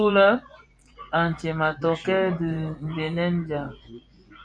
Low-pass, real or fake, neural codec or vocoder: 9.9 kHz; fake; vocoder, 24 kHz, 100 mel bands, Vocos